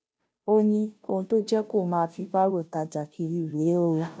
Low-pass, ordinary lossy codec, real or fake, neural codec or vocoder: none; none; fake; codec, 16 kHz, 0.5 kbps, FunCodec, trained on Chinese and English, 25 frames a second